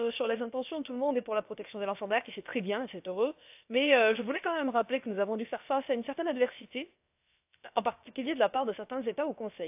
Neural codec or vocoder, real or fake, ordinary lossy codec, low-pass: codec, 16 kHz, about 1 kbps, DyCAST, with the encoder's durations; fake; AAC, 32 kbps; 3.6 kHz